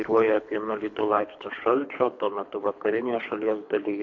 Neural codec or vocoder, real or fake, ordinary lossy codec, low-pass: codec, 24 kHz, 3 kbps, HILCodec; fake; MP3, 48 kbps; 7.2 kHz